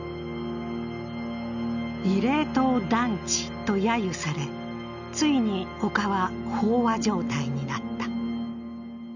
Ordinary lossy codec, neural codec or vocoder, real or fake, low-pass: none; none; real; 7.2 kHz